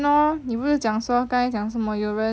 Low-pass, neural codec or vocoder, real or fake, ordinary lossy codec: none; none; real; none